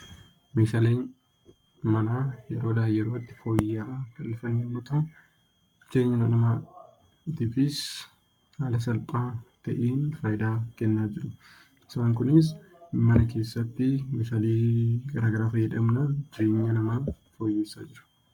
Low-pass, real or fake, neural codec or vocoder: 19.8 kHz; fake; codec, 44.1 kHz, 7.8 kbps, Pupu-Codec